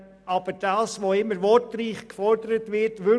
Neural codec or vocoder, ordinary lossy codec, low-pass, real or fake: none; none; none; real